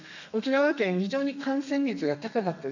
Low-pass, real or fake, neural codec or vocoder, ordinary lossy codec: 7.2 kHz; fake; codec, 32 kHz, 1.9 kbps, SNAC; none